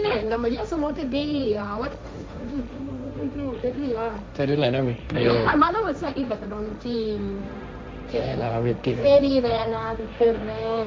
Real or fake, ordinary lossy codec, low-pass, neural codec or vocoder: fake; none; none; codec, 16 kHz, 1.1 kbps, Voila-Tokenizer